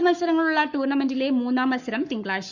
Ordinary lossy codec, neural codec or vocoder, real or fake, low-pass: Opus, 64 kbps; codec, 44.1 kHz, 7.8 kbps, Pupu-Codec; fake; 7.2 kHz